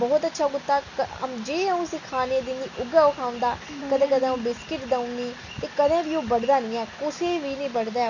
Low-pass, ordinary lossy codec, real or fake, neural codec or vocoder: 7.2 kHz; none; real; none